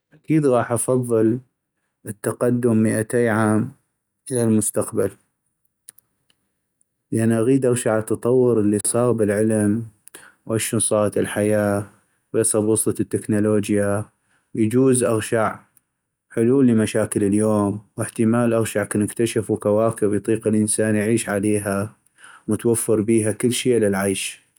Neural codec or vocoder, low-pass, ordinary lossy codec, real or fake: none; none; none; real